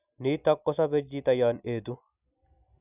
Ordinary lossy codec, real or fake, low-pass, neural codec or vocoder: none; real; 3.6 kHz; none